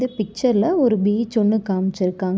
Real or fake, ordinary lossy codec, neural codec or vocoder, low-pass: real; none; none; none